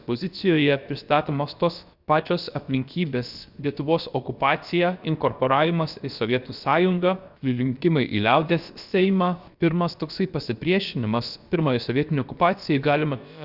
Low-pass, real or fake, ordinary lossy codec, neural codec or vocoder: 5.4 kHz; fake; Opus, 64 kbps; codec, 16 kHz, about 1 kbps, DyCAST, with the encoder's durations